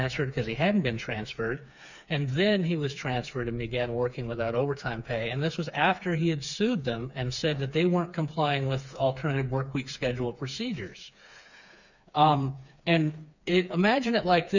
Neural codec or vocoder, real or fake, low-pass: codec, 16 kHz, 4 kbps, FreqCodec, smaller model; fake; 7.2 kHz